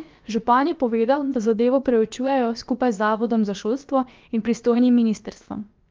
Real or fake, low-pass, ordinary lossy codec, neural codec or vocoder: fake; 7.2 kHz; Opus, 24 kbps; codec, 16 kHz, about 1 kbps, DyCAST, with the encoder's durations